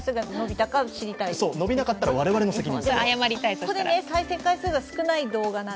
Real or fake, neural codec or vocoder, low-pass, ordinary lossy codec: real; none; none; none